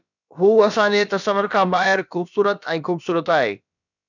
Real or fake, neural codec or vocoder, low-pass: fake; codec, 16 kHz, about 1 kbps, DyCAST, with the encoder's durations; 7.2 kHz